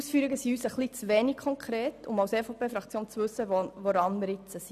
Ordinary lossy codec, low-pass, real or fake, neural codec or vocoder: none; 14.4 kHz; real; none